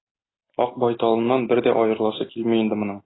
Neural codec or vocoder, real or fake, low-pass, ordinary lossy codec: none; real; 7.2 kHz; AAC, 16 kbps